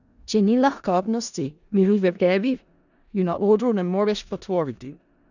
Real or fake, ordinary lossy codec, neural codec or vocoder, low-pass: fake; none; codec, 16 kHz in and 24 kHz out, 0.4 kbps, LongCat-Audio-Codec, four codebook decoder; 7.2 kHz